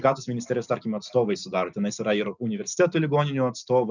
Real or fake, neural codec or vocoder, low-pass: real; none; 7.2 kHz